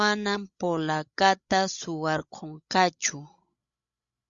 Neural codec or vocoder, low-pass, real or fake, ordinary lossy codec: codec, 16 kHz, 16 kbps, FreqCodec, larger model; 7.2 kHz; fake; Opus, 64 kbps